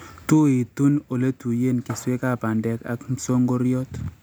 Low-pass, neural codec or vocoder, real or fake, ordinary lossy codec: none; none; real; none